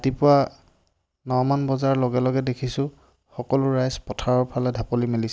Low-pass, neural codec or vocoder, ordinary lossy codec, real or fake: none; none; none; real